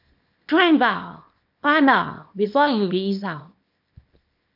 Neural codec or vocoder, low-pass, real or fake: codec, 24 kHz, 0.9 kbps, WavTokenizer, small release; 5.4 kHz; fake